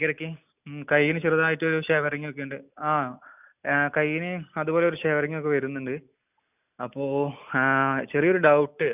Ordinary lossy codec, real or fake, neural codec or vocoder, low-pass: none; real; none; 3.6 kHz